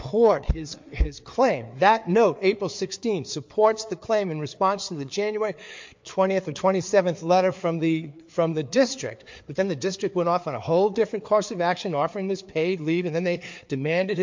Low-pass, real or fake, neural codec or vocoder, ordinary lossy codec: 7.2 kHz; fake; codec, 16 kHz, 4 kbps, FreqCodec, larger model; MP3, 48 kbps